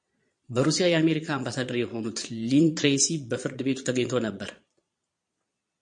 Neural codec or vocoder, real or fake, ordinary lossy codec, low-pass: vocoder, 22.05 kHz, 80 mel bands, Vocos; fake; MP3, 48 kbps; 9.9 kHz